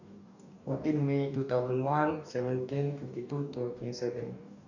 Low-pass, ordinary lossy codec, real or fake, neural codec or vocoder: 7.2 kHz; none; fake; codec, 44.1 kHz, 2.6 kbps, DAC